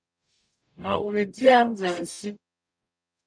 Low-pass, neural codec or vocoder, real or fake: 9.9 kHz; codec, 44.1 kHz, 0.9 kbps, DAC; fake